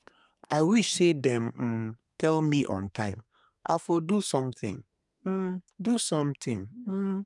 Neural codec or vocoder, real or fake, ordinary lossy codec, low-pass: codec, 24 kHz, 1 kbps, SNAC; fake; none; 10.8 kHz